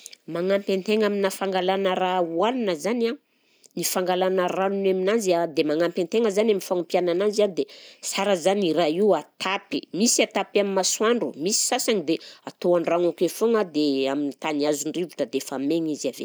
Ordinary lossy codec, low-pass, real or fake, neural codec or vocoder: none; none; real; none